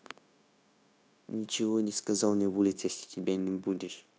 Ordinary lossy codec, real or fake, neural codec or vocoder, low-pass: none; fake; codec, 16 kHz, 0.9 kbps, LongCat-Audio-Codec; none